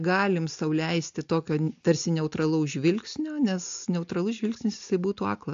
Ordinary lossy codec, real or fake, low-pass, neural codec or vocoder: AAC, 64 kbps; real; 7.2 kHz; none